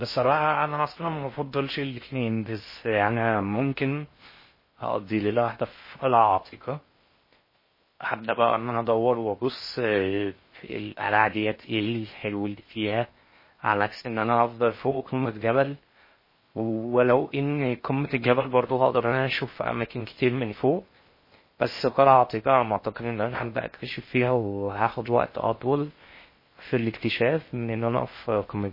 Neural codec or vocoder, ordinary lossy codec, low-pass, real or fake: codec, 16 kHz in and 24 kHz out, 0.6 kbps, FocalCodec, streaming, 2048 codes; MP3, 24 kbps; 5.4 kHz; fake